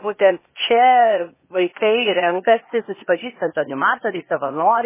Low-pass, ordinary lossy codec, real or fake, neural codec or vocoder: 3.6 kHz; MP3, 16 kbps; fake; codec, 16 kHz, 0.8 kbps, ZipCodec